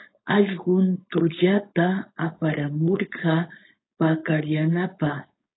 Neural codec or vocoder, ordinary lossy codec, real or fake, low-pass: codec, 16 kHz, 4.8 kbps, FACodec; AAC, 16 kbps; fake; 7.2 kHz